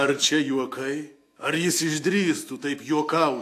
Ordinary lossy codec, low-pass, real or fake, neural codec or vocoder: AAC, 64 kbps; 14.4 kHz; fake; autoencoder, 48 kHz, 128 numbers a frame, DAC-VAE, trained on Japanese speech